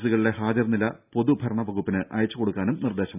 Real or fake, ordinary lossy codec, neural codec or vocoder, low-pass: real; none; none; 3.6 kHz